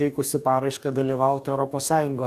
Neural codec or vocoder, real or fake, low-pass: codec, 44.1 kHz, 2.6 kbps, DAC; fake; 14.4 kHz